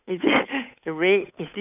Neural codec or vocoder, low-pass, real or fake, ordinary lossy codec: codec, 16 kHz, 8 kbps, FunCodec, trained on Chinese and English, 25 frames a second; 3.6 kHz; fake; none